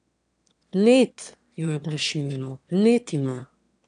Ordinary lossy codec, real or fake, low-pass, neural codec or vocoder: MP3, 96 kbps; fake; 9.9 kHz; autoencoder, 22.05 kHz, a latent of 192 numbers a frame, VITS, trained on one speaker